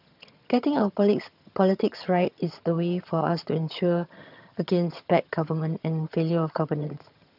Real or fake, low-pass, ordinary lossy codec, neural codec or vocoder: fake; 5.4 kHz; none; vocoder, 22.05 kHz, 80 mel bands, HiFi-GAN